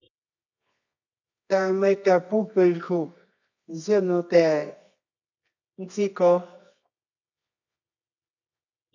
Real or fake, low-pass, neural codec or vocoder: fake; 7.2 kHz; codec, 24 kHz, 0.9 kbps, WavTokenizer, medium music audio release